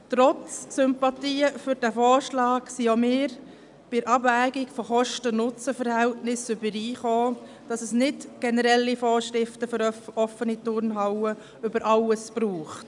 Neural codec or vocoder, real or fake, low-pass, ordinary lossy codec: none; real; 10.8 kHz; none